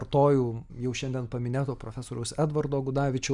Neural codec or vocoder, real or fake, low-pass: none; real; 10.8 kHz